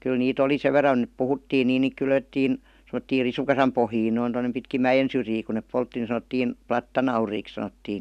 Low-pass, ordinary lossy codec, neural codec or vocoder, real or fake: 14.4 kHz; none; none; real